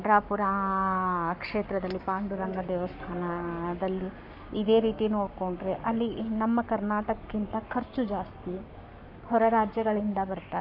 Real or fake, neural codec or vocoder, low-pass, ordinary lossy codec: fake; codec, 44.1 kHz, 7.8 kbps, Pupu-Codec; 5.4 kHz; MP3, 48 kbps